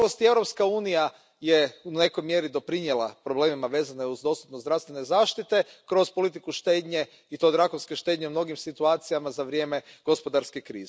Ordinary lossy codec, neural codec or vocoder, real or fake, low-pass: none; none; real; none